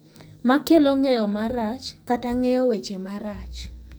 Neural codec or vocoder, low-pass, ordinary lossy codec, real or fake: codec, 44.1 kHz, 2.6 kbps, SNAC; none; none; fake